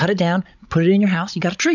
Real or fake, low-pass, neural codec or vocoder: fake; 7.2 kHz; codec, 16 kHz, 16 kbps, FreqCodec, larger model